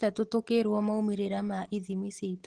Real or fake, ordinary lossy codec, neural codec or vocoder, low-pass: real; Opus, 16 kbps; none; 10.8 kHz